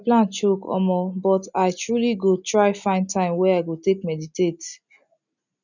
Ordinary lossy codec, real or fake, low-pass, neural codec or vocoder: none; real; 7.2 kHz; none